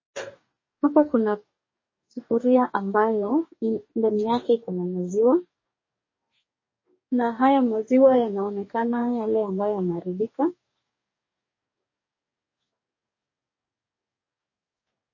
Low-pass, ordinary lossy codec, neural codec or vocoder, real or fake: 7.2 kHz; MP3, 32 kbps; codec, 44.1 kHz, 2.6 kbps, DAC; fake